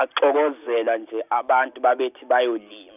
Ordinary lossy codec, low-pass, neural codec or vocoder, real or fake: none; 3.6 kHz; none; real